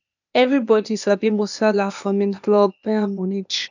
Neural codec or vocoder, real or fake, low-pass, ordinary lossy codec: codec, 16 kHz, 0.8 kbps, ZipCodec; fake; 7.2 kHz; none